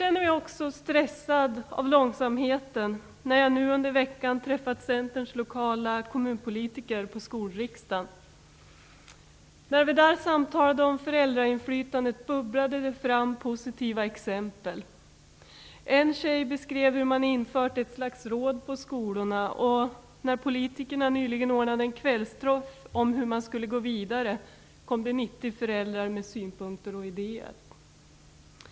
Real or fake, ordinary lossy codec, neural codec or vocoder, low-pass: real; none; none; none